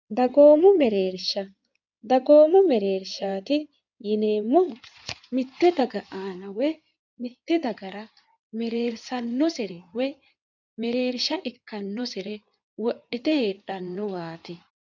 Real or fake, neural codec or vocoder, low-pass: fake; codec, 16 kHz in and 24 kHz out, 2.2 kbps, FireRedTTS-2 codec; 7.2 kHz